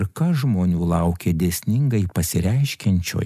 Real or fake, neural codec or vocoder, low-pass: real; none; 14.4 kHz